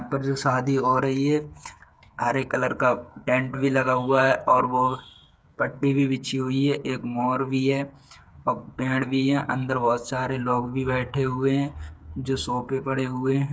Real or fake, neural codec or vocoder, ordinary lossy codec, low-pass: fake; codec, 16 kHz, 8 kbps, FreqCodec, smaller model; none; none